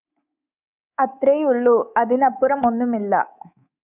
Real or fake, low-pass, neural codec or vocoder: fake; 3.6 kHz; codec, 24 kHz, 3.1 kbps, DualCodec